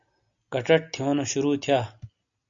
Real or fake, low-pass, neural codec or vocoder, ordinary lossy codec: real; 7.2 kHz; none; AAC, 64 kbps